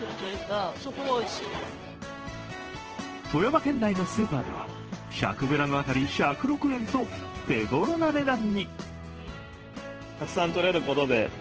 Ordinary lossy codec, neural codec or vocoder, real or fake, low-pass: Opus, 16 kbps; codec, 16 kHz in and 24 kHz out, 1 kbps, XY-Tokenizer; fake; 7.2 kHz